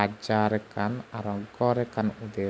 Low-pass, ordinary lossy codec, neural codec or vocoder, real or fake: none; none; none; real